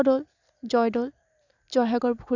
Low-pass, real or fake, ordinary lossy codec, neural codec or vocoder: 7.2 kHz; real; none; none